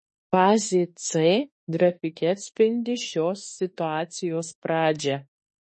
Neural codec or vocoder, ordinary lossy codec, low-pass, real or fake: autoencoder, 48 kHz, 32 numbers a frame, DAC-VAE, trained on Japanese speech; MP3, 32 kbps; 10.8 kHz; fake